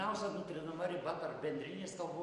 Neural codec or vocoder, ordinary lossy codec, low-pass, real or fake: none; MP3, 48 kbps; 10.8 kHz; real